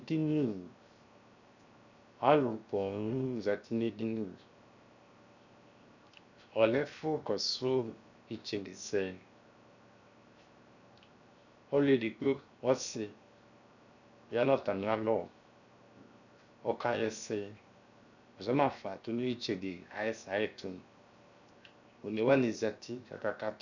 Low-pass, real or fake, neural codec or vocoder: 7.2 kHz; fake; codec, 16 kHz, 0.7 kbps, FocalCodec